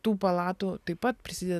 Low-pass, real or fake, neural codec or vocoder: 14.4 kHz; real; none